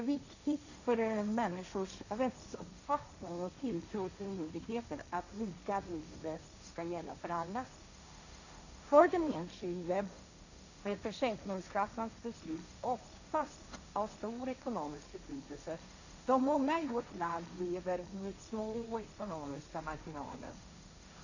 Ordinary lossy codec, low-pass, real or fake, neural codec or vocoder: none; 7.2 kHz; fake; codec, 16 kHz, 1.1 kbps, Voila-Tokenizer